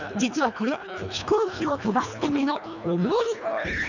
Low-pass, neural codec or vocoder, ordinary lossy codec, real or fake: 7.2 kHz; codec, 24 kHz, 1.5 kbps, HILCodec; none; fake